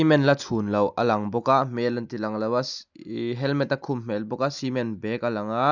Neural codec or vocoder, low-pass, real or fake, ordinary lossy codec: none; 7.2 kHz; real; none